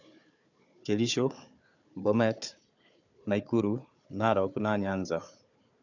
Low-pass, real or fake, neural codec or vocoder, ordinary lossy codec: 7.2 kHz; fake; codec, 16 kHz, 4 kbps, FunCodec, trained on Chinese and English, 50 frames a second; none